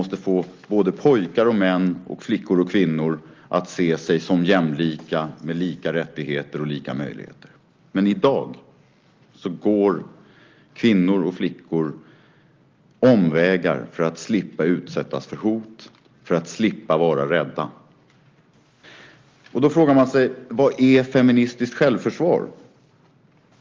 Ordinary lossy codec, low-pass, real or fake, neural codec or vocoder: Opus, 32 kbps; 7.2 kHz; real; none